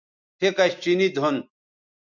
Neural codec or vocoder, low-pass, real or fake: none; 7.2 kHz; real